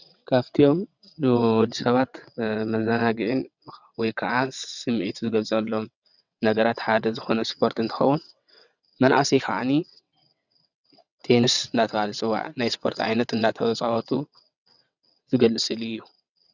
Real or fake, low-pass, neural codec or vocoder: fake; 7.2 kHz; vocoder, 22.05 kHz, 80 mel bands, WaveNeXt